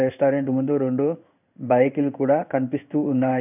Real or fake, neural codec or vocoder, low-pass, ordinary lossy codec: fake; codec, 16 kHz in and 24 kHz out, 1 kbps, XY-Tokenizer; 3.6 kHz; none